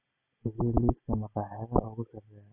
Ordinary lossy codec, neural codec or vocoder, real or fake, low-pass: Opus, 64 kbps; none; real; 3.6 kHz